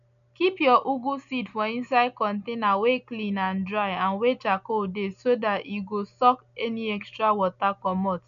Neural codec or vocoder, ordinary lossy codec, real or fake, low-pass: none; none; real; 7.2 kHz